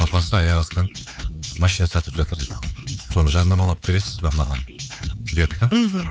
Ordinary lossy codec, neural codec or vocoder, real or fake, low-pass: none; codec, 16 kHz, 4 kbps, X-Codec, HuBERT features, trained on LibriSpeech; fake; none